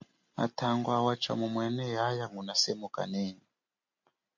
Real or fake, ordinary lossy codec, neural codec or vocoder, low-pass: real; MP3, 48 kbps; none; 7.2 kHz